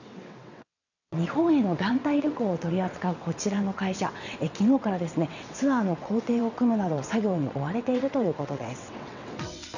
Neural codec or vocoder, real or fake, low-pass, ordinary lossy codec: vocoder, 22.05 kHz, 80 mel bands, WaveNeXt; fake; 7.2 kHz; none